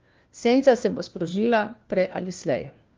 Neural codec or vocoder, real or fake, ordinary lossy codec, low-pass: codec, 16 kHz, 1 kbps, FunCodec, trained on LibriTTS, 50 frames a second; fake; Opus, 32 kbps; 7.2 kHz